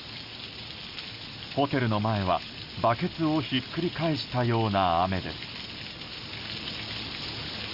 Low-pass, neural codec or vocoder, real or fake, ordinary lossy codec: 5.4 kHz; none; real; Opus, 64 kbps